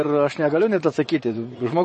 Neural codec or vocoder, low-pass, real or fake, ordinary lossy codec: vocoder, 24 kHz, 100 mel bands, Vocos; 10.8 kHz; fake; MP3, 32 kbps